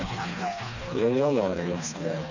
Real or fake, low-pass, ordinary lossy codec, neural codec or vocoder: fake; 7.2 kHz; none; codec, 16 kHz, 2 kbps, FreqCodec, smaller model